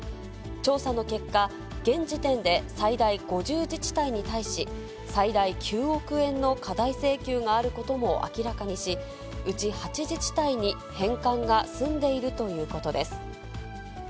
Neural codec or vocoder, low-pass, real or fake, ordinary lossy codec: none; none; real; none